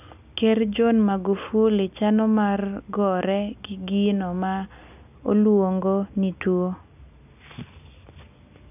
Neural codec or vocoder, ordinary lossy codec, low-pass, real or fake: none; none; 3.6 kHz; real